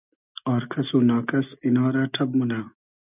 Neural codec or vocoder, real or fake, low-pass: none; real; 3.6 kHz